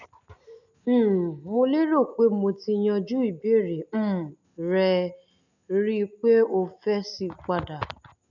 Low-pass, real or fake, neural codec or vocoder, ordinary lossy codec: 7.2 kHz; real; none; none